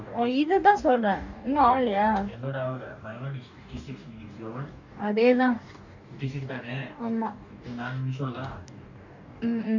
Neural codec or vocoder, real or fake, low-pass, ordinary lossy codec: codec, 44.1 kHz, 2.6 kbps, DAC; fake; 7.2 kHz; none